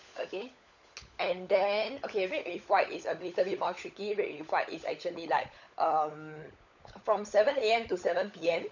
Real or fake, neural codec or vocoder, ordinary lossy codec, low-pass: fake; codec, 16 kHz, 16 kbps, FunCodec, trained on LibriTTS, 50 frames a second; none; 7.2 kHz